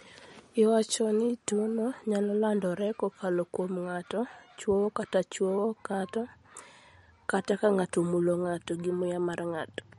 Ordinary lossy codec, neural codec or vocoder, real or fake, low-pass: MP3, 48 kbps; none; real; 19.8 kHz